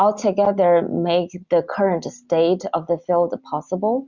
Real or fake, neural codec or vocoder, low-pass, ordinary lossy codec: real; none; 7.2 kHz; Opus, 64 kbps